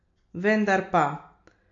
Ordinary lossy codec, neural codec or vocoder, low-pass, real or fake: MP3, 48 kbps; none; 7.2 kHz; real